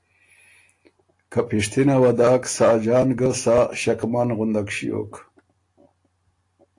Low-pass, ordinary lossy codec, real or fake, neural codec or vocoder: 10.8 kHz; AAC, 48 kbps; real; none